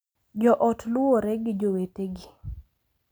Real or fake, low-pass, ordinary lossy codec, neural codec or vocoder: real; none; none; none